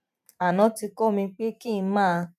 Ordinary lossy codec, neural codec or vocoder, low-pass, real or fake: none; vocoder, 44.1 kHz, 128 mel bands every 512 samples, BigVGAN v2; 14.4 kHz; fake